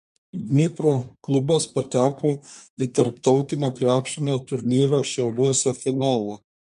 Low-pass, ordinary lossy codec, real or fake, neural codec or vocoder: 10.8 kHz; MP3, 64 kbps; fake; codec, 24 kHz, 1 kbps, SNAC